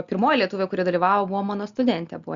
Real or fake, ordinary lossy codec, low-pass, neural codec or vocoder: real; Opus, 64 kbps; 7.2 kHz; none